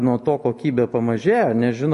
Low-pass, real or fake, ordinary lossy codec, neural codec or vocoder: 14.4 kHz; real; MP3, 48 kbps; none